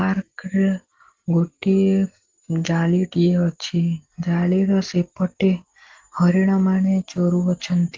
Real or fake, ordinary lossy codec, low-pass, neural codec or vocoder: real; Opus, 16 kbps; 7.2 kHz; none